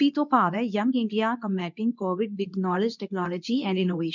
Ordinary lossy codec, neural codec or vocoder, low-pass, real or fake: none; codec, 24 kHz, 0.9 kbps, WavTokenizer, medium speech release version 2; 7.2 kHz; fake